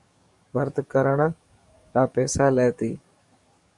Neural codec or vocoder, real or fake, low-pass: codec, 44.1 kHz, 7.8 kbps, DAC; fake; 10.8 kHz